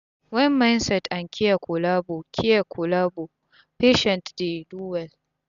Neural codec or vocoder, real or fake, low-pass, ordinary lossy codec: none; real; 7.2 kHz; none